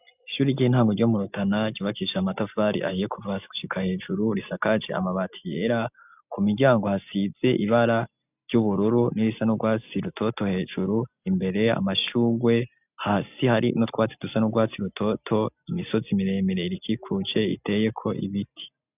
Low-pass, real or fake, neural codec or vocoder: 3.6 kHz; real; none